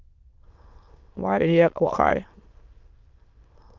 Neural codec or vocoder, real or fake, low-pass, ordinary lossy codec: autoencoder, 22.05 kHz, a latent of 192 numbers a frame, VITS, trained on many speakers; fake; 7.2 kHz; Opus, 32 kbps